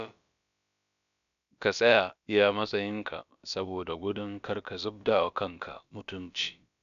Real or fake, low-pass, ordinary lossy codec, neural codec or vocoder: fake; 7.2 kHz; none; codec, 16 kHz, about 1 kbps, DyCAST, with the encoder's durations